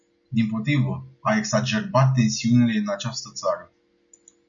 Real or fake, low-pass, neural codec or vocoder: real; 7.2 kHz; none